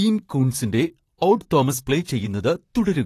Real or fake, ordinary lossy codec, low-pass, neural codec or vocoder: fake; AAC, 48 kbps; 14.4 kHz; vocoder, 44.1 kHz, 128 mel bands, Pupu-Vocoder